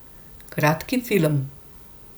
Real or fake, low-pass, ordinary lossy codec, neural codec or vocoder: real; none; none; none